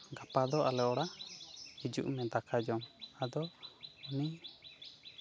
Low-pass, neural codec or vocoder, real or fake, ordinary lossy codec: none; none; real; none